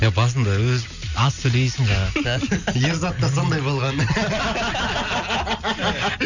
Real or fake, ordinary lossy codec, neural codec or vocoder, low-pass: real; none; none; 7.2 kHz